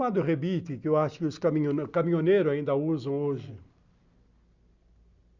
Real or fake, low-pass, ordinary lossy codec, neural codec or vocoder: real; 7.2 kHz; Opus, 64 kbps; none